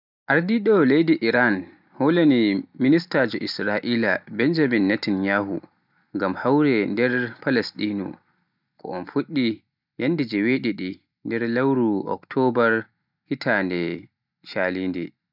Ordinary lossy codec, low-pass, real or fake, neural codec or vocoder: none; 5.4 kHz; real; none